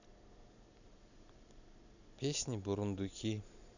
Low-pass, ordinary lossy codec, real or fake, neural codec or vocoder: 7.2 kHz; none; real; none